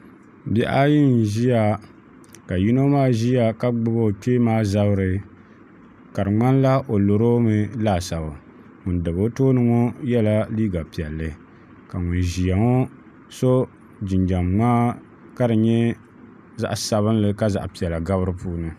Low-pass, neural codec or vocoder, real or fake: 14.4 kHz; none; real